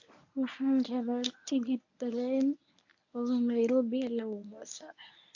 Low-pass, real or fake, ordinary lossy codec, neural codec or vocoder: 7.2 kHz; fake; AAC, 48 kbps; codec, 24 kHz, 0.9 kbps, WavTokenizer, medium speech release version 1